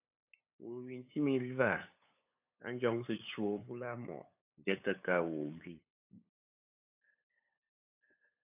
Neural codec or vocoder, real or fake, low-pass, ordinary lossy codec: codec, 16 kHz, 8 kbps, FunCodec, trained on LibriTTS, 25 frames a second; fake; 3.6 kHz; AAC, 32 kbps